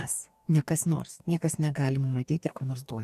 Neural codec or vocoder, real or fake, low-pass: codec, 44.1 kHz, 2.6 kbps, DAC; fake; 14.4 kHz